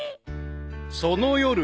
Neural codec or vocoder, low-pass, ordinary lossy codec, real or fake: none; none; none; real